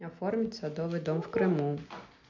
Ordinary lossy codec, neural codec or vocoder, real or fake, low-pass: MP3, 64 kbps; none; real; 7.2 kHz